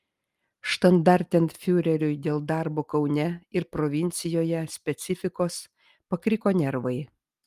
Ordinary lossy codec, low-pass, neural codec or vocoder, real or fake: Opus, 32 kbps; 14.4 kHz; none; real